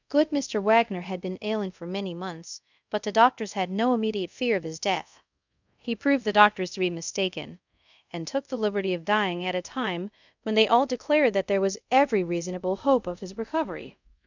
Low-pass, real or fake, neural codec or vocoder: 7.2 kHz; fake; codec, 24 kHz, 0.5 kbps, DualCodec